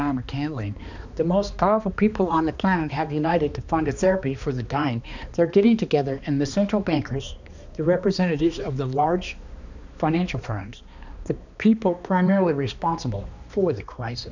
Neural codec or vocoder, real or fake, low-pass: codec, 16 kHz, 2 kbps, X-Codec, HuBERT features, trained on balanced general audio; fake; 7.2 kHz